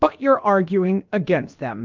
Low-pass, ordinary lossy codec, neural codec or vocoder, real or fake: 7.2 kHz; Opus, 32 kbps; codec, 16 kHz, about 1 kbps, DyCAST, with the encoder's durations; fake